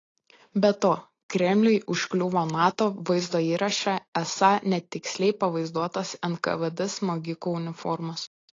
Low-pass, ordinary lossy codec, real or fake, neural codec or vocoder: 7.2 kHz; AAC, 32 kbps; real; none